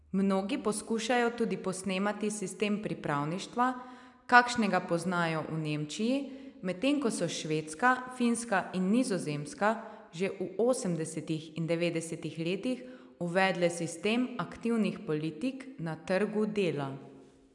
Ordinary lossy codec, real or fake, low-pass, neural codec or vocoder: none; real; 10.8 kHz; none